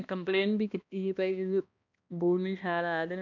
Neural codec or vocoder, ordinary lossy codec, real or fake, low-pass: codec, 16 kHz, 1 kbps, X-Codec, HuBERT features, trained on balanced general audio; none; fake; 7.2 kHz